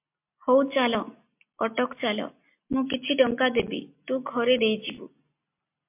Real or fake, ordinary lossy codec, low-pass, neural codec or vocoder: real; MP3, 32 kbps; 3.6 kHz; none